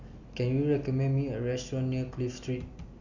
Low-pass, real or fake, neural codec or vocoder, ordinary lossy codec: 7.2 kHz; real; none; Opus, 64 kbps